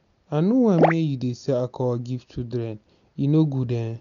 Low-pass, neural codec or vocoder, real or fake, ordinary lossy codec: 7.2 kHz; none; real; none